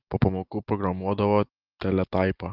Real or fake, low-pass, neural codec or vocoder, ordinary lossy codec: real; 5.4 kHz; none; Opus, 32 kbps